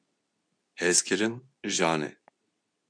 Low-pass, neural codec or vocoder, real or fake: 9.9 kHz; codec, 24 kHz, 0.9 kbps, WavTokenizer, medium speech release version 2; fake